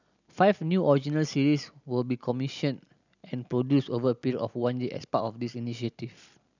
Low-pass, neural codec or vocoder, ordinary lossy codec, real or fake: 7.2 kHz; none; none; real